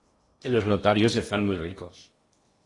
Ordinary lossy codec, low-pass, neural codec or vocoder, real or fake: AAC, 32 kbps; 10.8 kHz; codec, 16 kHz in and 24 kHz out, 0.8 kbps, FocalCodec, streaming, 65536 codes; fake